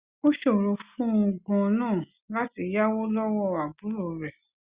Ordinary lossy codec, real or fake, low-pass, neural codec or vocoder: Opus, 64 kbps; real; 3.6 kHz; none